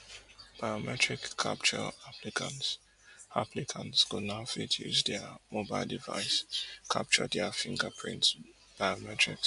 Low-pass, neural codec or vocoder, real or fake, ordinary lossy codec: 10.8 kHz; none; real; MP3, 64 kbps